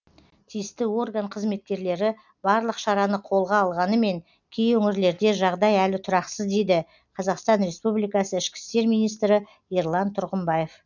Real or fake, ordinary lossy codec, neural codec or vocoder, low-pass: real; none; none; 7.2 kHz